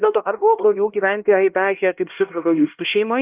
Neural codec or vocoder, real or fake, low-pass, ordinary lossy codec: codec, 16 kHz, 1 kbps, X-Codec, HuBERT features, trained on LibriSpeech; fake; 3.6 kHz; Opus, 24 kbps